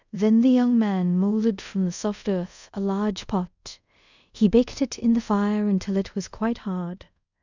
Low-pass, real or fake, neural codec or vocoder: 7.2 kHz; fake; codec, 24 kHz, 0.5 kbps, DualCodec